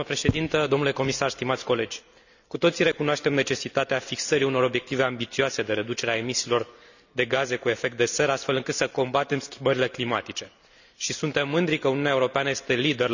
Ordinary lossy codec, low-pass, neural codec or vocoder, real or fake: none; 7.2 kHz; none; real